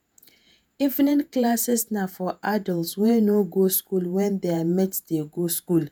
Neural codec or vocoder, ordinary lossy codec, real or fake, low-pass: vocoder, 48 kHz, 128 mel bands, Vocos; none; fake; none